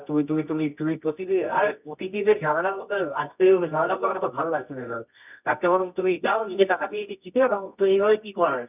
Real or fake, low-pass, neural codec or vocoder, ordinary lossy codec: fake; 3.6 kHz; codec, 24 kHz, 0.9 kbps, WavTokenizer, medium music audio release; none